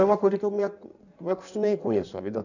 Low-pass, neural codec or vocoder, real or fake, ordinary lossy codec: 7.2 kHz; codec, 16 kHz in and 24 kHz out, 1.1 kbps, FireRedTTS-2 codec; fake; none